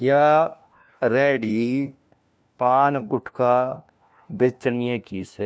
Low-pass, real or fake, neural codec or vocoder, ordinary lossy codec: none; fake; codec, 16 kHz, 1 kbps, FunCodec, trained on LibriTTS, 50 frames a second; none